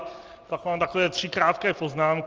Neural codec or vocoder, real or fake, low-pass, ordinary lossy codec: none; real; 7.2 kHz; Opus, 24 kbps